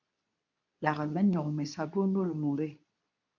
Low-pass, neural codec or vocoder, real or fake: 7.2 kHz; codec, 24 kHz, 0.9 kbps, WavTokenizer, medium speech release version 2; fake